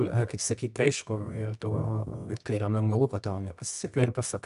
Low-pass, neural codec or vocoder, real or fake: 10.8 kHz; codec, 24 kHz, 0.9 kbps, WavTokenizer, medium music audio release; fake